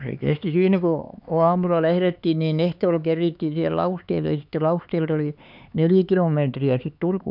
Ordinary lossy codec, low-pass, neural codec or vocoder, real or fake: none; 5.4 kHz; codec, 16 kHz, 4 kbps, X-Codec, HuBERT features, trained on LibriSpeech; fake